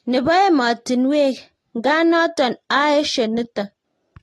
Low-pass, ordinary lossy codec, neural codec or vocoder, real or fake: 19.8 kHz; AAC, 32 kbps; none; real